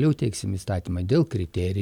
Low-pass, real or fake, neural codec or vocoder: 19.8 kHz; real; none